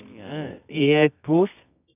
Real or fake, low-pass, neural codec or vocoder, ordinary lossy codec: fake; 3.6 kHz; codec, 24 kHz, 0.9 kbps, WavTokenizer, medium music audio release; none